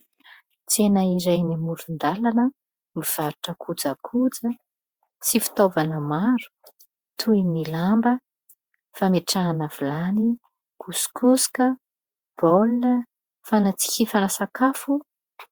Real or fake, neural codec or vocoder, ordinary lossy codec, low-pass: fake; vocoder, 48 kHz, 128 mel bands, Vocos; Opus, 64 kbps; 19.8 kHz